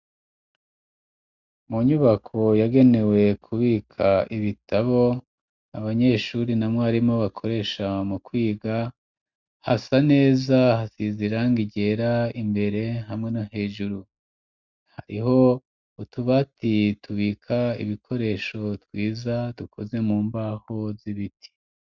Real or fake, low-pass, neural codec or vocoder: real; 7.2 kHz; none